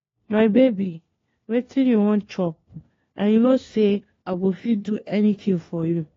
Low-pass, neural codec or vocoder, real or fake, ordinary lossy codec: 7.2 kHz; codec, 16 kHz, 1 kbps, FunCodec, trained on LibriTTS, 50 frames a second; fake; AAC, 32 kbps